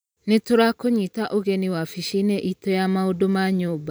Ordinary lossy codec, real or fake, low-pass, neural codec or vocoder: none; fake; none; vocoder, 44.1 kHz, 128 mel bands every 512 samples, BigVGAN v2